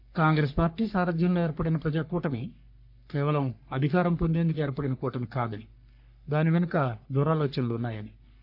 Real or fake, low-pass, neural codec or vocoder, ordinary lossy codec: fake; 5.4 kHz; codec, 44.1 kHz, 3.4 kbps, Pupu-Codec; none